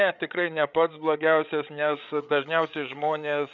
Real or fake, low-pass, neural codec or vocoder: fake; 7.2 kHz; codec, 16 kHz, 8 kbps, FreqCodec, larger model